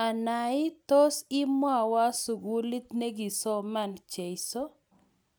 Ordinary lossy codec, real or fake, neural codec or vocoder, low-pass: none; real; none; none